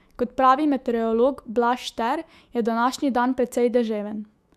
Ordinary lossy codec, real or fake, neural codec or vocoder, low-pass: Opus, 64 kbps; fake; autoencoder, 48 kHz, 128 numbers a frame, DAC-VAE, trained on Japanese speech; 14.4 kHz